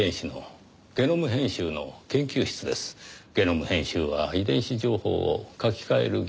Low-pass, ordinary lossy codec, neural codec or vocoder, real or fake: none; none; none; real